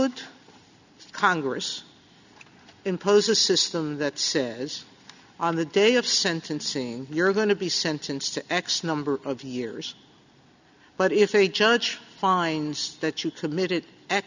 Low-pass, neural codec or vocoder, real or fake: 7.2 kHz; none; real